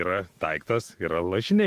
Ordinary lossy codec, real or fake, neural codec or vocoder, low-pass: Opus, 24 kbps; fake; autoencoder, 48 kHz, 128 numbers a frame, DAC-VAE, trained on Japanese speech; 14.4 kHz